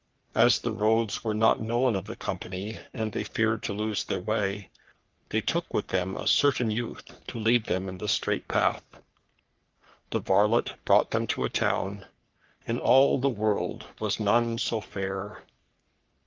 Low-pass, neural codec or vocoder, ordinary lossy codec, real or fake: 7.2 kHz; codec, 44.1 kHz, 3.4 kbps, Pupu-Codec; Opus, 32 kbps; fake